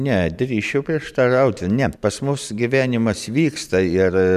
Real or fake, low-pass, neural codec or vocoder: fake; 14.4 kHz; vocoder, 44.1 kHz, 128 mel bands every 512 samples, BigVGAN v2